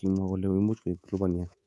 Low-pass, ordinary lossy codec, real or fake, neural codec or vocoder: none; none; fake; codec, 24 kHz, 3.1 kbps, DualCodec